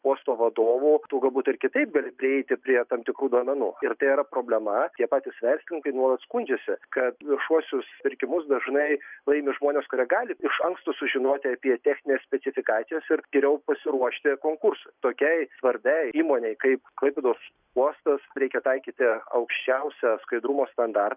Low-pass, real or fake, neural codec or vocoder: 3.6 kHz; real; none